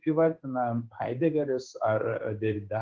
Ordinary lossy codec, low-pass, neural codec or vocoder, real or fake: Opus, 16 kbps; 7.2 kHz; codec, 16 kHz in and 24 kHz out, 1 kbps, XY-Tokenizer; fake